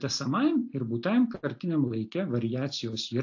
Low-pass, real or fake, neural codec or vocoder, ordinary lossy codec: 7.2 kHz; real; none; AAC, 48 kbps